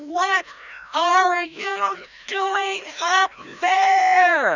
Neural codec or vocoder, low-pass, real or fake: codec, 16 kHz, 1 kbps, FreqCodec, larger model; 7.2 kHz; fake